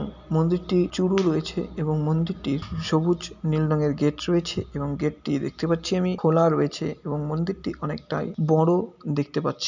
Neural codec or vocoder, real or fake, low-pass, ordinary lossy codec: none; real; 7.2 kHz; none